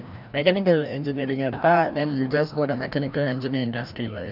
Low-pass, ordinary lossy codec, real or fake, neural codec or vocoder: 5.4 kHz; none; fake; codec, 16 kHz, 1 kbps, FreqCodec, larger model